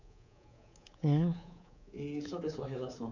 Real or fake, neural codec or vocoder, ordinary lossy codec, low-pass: fake; codec, 24 kHz, 3.1 kbps, DualCodec; none; 7.2 kHz